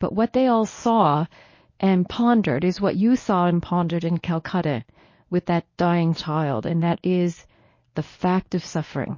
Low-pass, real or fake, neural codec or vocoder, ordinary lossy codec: 7.2 kHz; fake; codec, 24 kHz, 0.9 kbps, WavTokenizer, medium speech release version 1; MP3, 32 kbps